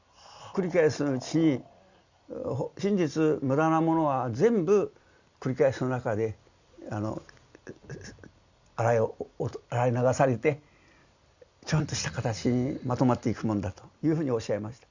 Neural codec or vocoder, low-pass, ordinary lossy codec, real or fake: none; 7.2 kHz; Opus, 64 kbps; real